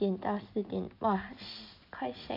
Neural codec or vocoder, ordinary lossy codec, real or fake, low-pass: none; none; real; 5.4 kHz